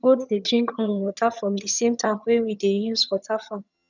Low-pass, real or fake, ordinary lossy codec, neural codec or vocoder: 7.2 kHz; fake; none; vocoder, 22.05 kHz, 80 mel bands, HiFi-GAN